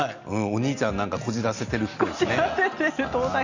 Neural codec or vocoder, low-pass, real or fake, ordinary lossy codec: none; 7.2 kHz; real; Opus, 64 kbps